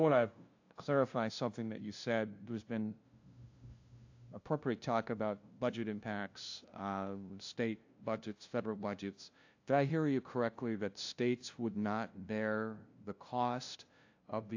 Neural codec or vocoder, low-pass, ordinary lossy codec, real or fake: codec, 16 kHz, 0.5 kbps, FunCodec, trained on LibriTTS, 25 frames a second; 7.2 kHz; AAC, 48 kbps; fake